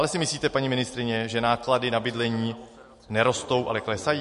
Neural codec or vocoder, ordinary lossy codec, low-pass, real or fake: none; MP3, 48 kbps; 14.4 kHz; real